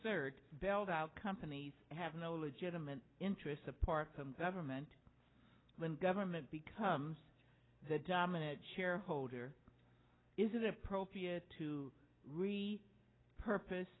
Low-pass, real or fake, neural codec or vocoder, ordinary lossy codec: 7.2 kHz; real; none; AAC, 16 kbps